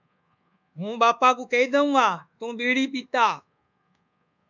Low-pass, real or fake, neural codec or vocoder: 7.2 kHz; fake; codec, 24 kHz, 1.2 kbps, DualCodec